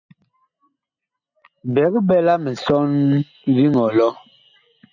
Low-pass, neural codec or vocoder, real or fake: 7.2 kHz; none; real